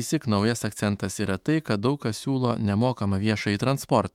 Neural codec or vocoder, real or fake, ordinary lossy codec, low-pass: autoencoder, 48 kHz, 128 numbers a frame, DAC-VAE, trained on Japanese speech; fake; MP3, 96 kbps; 19.8 kHz